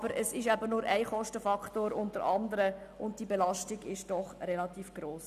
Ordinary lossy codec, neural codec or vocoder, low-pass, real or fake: none; none; 14.4 kHz; real